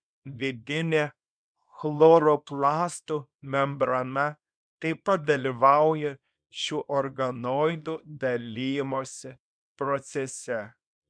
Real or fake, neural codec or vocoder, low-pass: fake; codec, 24 kHz, 0.9 kbps, WavTokenizer, small release; 9.9 kHz